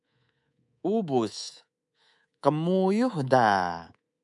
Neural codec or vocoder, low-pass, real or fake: codec, 24 kHz, 3.1 kbps, DualCodec; 10.8 kHz; fake